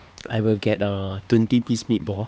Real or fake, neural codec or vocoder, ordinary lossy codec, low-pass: fake; codec, 16 kHz, 2 kbps, X-Codec, HuBERT features, trained on LibriSpeech; none; none